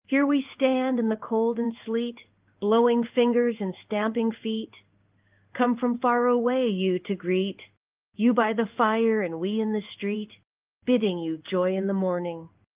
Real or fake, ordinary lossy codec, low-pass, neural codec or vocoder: fake; Opus, 32 kbps; 3.6 kHz; codec, 16 kHz in and 24 kHz out, 1 kbps, XY-Tokenizer